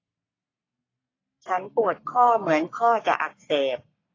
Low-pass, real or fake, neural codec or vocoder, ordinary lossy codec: 7.2 kHz; fake; codec, 44.1 kHz, 3.4 kbps, Pupu-Codec; AAC, 32 kbps